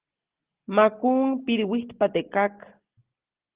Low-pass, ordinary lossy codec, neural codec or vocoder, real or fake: 3.6 kHz; Opus, 16 kbps; none; real